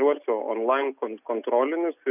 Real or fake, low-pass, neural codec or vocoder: real; 3.6 kHz; none